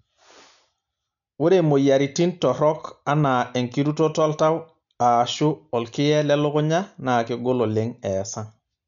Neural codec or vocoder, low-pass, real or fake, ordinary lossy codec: none; 7.2 kHz; real; none